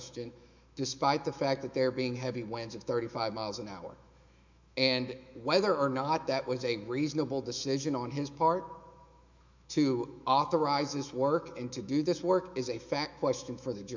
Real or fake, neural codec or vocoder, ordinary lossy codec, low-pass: fake; autoencoder, 48 kHz, 128 numbers a frame, DAC-VAE, trained on Japanese speech; MP3, 64 kbps; 7.2 kHz